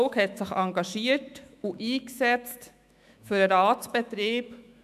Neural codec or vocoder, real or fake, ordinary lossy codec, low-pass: autoencoder, 48 kHz, 128 numbers a frame, DAC-VAE, trained on Japanese speech; fake; none; 14.4 kHz